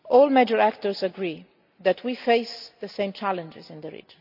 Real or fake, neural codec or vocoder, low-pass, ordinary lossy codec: real; none; 5.4 kHz; none